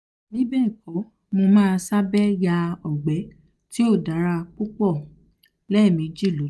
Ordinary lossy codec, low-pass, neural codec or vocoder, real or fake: none; none; none; real